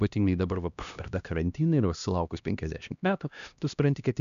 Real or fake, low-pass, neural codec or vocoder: fake; 7.2 kHz; codec, 16 kHz, 1 kbps, X-Codec, HuBERT features, trained on LibriSpeech